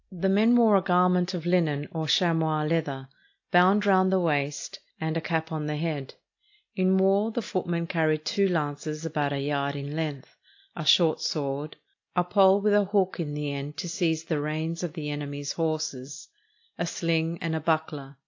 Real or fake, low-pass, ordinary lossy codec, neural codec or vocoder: real; 7.2 kHz; AAC, 48 kbps; none